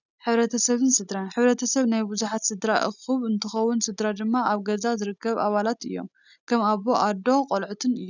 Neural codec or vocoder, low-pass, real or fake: none; 7.2 kHz; real